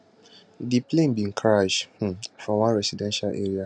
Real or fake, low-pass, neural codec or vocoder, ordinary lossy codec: real; 9.9 kHz; none; none